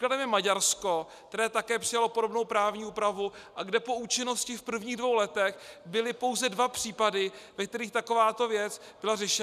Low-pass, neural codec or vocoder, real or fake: 14.4 kHz; none; real